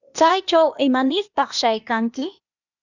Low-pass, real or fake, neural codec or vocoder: 7.2 kHz; fake; codec, 16 kHz, 0.8 kbps, ZipCodec